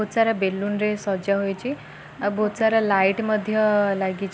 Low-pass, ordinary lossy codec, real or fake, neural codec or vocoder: none; none; real; none